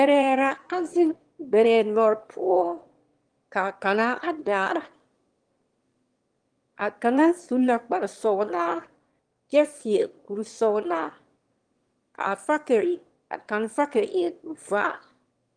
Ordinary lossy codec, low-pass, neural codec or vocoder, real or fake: Opus, 24 kbps; 9.9 kHz; autoencoder, 22.05 kHz, a latent of 192 numbers a frame, VITS, trained on one speaker; fake